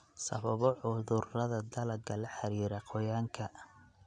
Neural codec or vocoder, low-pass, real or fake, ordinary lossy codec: none; 9.9 kHz; real; none